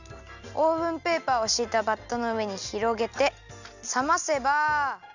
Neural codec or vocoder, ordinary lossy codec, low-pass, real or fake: none; none; 7.2 kHz; real